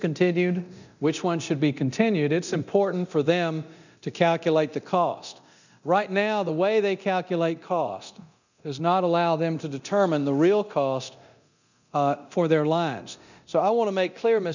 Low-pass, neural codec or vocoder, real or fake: 7.2 kHz; codec, 24 kHz, 0.9 kbps, DualCodec; fake